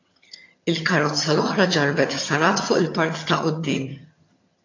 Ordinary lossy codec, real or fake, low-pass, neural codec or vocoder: AAC, 32 kbps; fake; 7.2 kHz; vocoder, 22.05 kHz, 80 mel bands, HiFi-GAN